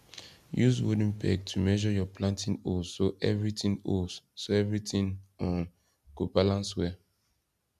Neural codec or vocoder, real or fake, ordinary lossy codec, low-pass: none; real; none; 14.4 kHz